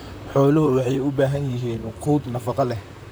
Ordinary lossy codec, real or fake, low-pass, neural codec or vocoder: none; fake; none; vocoder, 44.1 kHz, 128 mel bands, Pupu-Vocoder